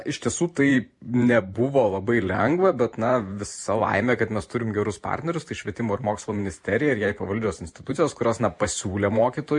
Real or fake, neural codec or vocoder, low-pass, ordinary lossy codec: fake; vocoder, 44.1 kHz, 128 mel bands every 512 samples, BigVGAN v2; 10.8 kHz; MP3, 48 kbps